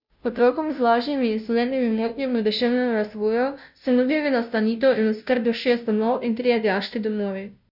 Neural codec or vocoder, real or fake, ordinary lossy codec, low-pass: codec, 16 kHz, 0.5 kbps, FunCodec, trained on Chinese and English, 25 frames a second; fake; none; 5.4 kHz